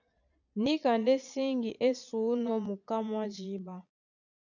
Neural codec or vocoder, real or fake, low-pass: vocoder, 22.05 kHz, 80 mel bands, Vocos; fake; 7.2 kHz